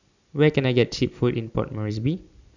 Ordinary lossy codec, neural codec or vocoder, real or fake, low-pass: none; none; real; 7.2 kHz